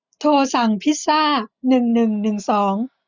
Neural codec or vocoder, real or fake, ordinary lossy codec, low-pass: none; real; none; 7.2 kHz